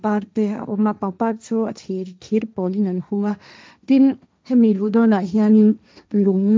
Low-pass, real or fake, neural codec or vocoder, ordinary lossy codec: none; fake; codec, 16 kHz, 1.1 kbps, Voila-Tokenizer; none